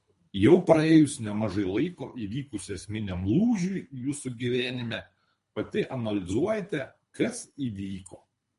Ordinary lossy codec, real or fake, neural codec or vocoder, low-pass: MP3, 48 kbps; fake; codec, 24 kHz, 3 kbps, HILCodec; 10.8 kHz